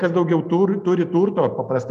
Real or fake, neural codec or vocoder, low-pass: real; none; 14.4 kHz